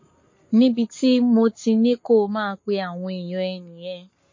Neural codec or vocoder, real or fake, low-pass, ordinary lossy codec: codec, 44.1 kHz, 7.8 kbps, Pupu-Codec; fake; 7.2 kHz; MP3, 32 kbps